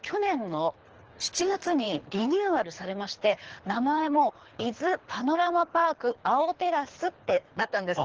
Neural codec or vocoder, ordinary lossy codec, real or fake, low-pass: codec, 24 kHz, 3 kbps, HILCodec; Opus, 16 kbps; fake; 7.2 kHz